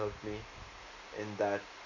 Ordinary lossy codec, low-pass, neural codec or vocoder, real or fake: none; 7.2 kHz; none; real